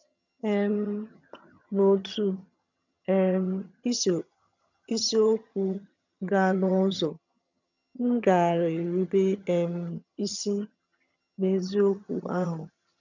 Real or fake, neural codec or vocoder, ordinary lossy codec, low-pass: fake; vocoder, 22.05 kHz, 80 mel bands, HiFi-GAN; none; 7.2 kHz